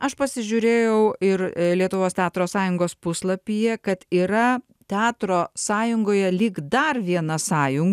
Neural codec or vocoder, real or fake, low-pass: none; real; 14.4 kHz